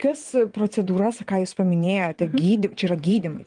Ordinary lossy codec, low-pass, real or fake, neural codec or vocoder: Opus, 24 kbps; 9.9 kHz; fake; vocoder, 22.05 kHz, 80 mel bands, WaveNeXt